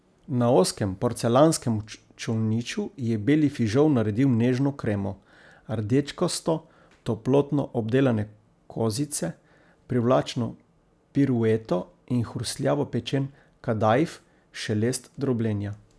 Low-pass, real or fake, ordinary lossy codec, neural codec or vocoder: none; real; none; none